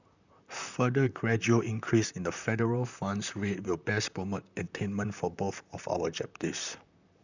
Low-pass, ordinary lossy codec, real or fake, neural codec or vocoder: 7.2 kHz; none; fake; vocoder, 44.1 kHz, 128 mel bands, Pupu-Vocoder